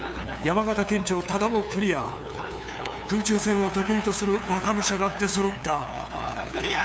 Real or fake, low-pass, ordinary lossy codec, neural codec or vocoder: fake; none; none; codec, 16 kHz, 2 kbps, FunCodec, trained on LibriTTS, 25 frames a second